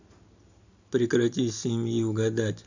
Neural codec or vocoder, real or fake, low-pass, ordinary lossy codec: none; real; 7.2 kHz; none